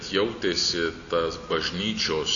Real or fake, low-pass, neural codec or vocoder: real; 7.2 kHz; none